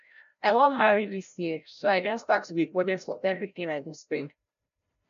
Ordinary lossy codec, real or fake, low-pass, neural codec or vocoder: none; fake; 7.2 kHz; codec, 16 kHz, 0.5 kbps, FreqCodec, larger model